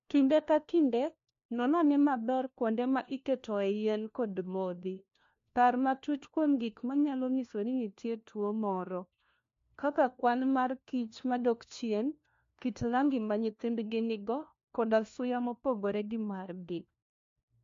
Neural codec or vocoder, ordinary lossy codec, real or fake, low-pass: codec, 16 kHz, 1 kbps, FunCodec, trained on LibriTTS, 50 frames a second; MP3, 48 kbps; fake; 7.2 kHz